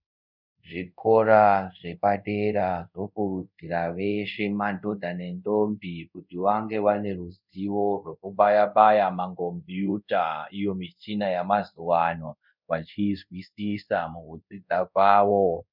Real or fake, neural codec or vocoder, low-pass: fake; codec, 24 kHz, 0.5 kbps, DualCodec; 5.4 kHz